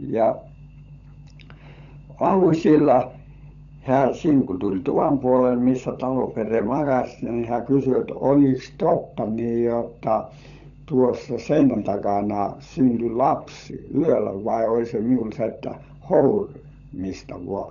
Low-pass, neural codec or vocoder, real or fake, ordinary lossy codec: 7.2 kHz; codec, 16 kHz, 16 kbps, FunCodec, trained on LibriTTS, 50 frames a second; fake; none